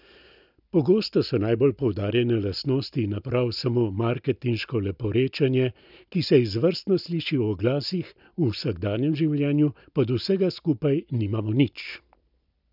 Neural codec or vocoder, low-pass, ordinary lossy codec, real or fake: none; 5.4 kHz; none; real